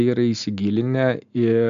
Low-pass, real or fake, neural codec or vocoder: 7.2 kHz; real; none